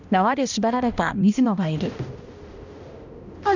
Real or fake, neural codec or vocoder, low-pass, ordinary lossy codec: fake; codec, 16 kHz, 1 kbps, X-Codec, HuBERT features, trained on balanced general audio; 7.2 kHz; none